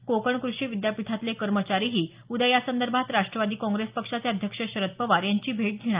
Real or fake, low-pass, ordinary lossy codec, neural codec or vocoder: real; 3.6 kHz; Opus, 24 kbps; none